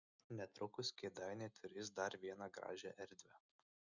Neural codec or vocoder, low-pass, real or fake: none; 7.2 kHz; real